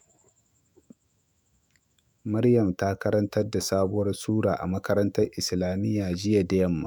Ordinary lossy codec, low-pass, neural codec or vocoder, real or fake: none; none; autoencoder, 48 kHz, 128 numbers a frame, DAC-VAE, trained on Japanese speech; fake